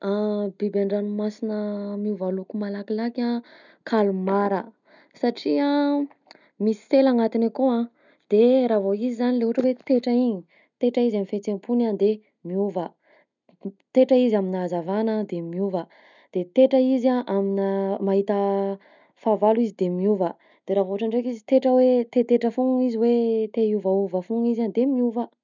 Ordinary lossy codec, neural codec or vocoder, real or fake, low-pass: none; none; real; 7.2 kHz